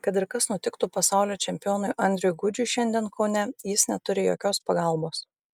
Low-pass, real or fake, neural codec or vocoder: 19.8 kHz; real; none